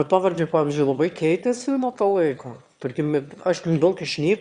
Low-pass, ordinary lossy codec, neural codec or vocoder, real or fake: 9.9 kHz; Opus, 64 kbps; autoencoder, 22.05 kHz, a latent of 192 numbers a frame, VITS, trained on one speaker; fake